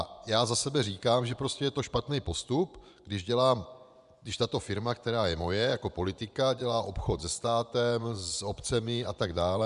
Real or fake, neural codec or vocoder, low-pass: fake; vocoder, 24 kHz, 100 mel bands, Vocos; 10.8 kHz